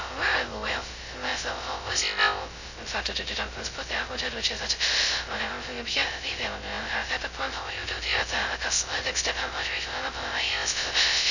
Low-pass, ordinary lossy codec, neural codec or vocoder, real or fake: 7.2 kHz; none; codec, 16 kHz, 0.2 kbps, FocalCodec; fake